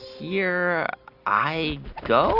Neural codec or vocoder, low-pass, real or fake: none; 5.4 kHz; real